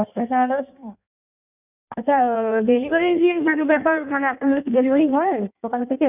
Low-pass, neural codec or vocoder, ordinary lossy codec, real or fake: 3.6 kHz; codec, 16 kHz in and 24 kHz out, 1.1 kbps, FireRedTTS-2 codec; none; fake